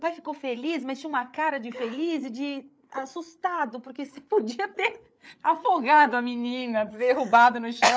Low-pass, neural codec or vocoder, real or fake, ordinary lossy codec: none; codec, 16 kHz, 8 kbps, FreqCodec, larger model; fake; none